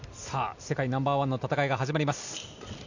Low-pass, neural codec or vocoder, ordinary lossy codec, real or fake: 7.2 kHz; none; none; real